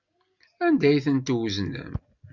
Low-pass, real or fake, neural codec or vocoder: 7.2 kHz; real; none